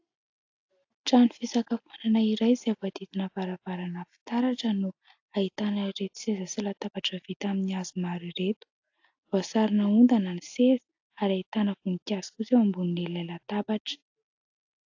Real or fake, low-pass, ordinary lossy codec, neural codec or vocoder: real; 7.2 kHz; AAC, 48 kbps; none